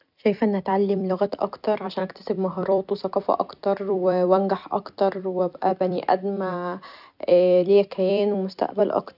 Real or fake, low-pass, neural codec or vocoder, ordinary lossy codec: fake; 5.4 kHz; vocoder, 44.1 kHz, 128 mel bands every 256 samples, BigVGAN v2; none